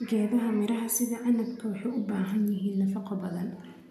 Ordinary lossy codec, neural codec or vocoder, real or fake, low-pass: none; none; real; 14.4 kHz